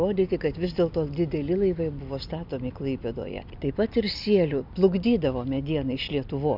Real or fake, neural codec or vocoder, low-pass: real; none; 5.4 kHz